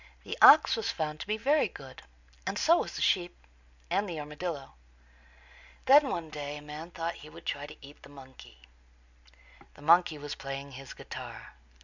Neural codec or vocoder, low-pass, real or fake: none; 7.2 kHz; real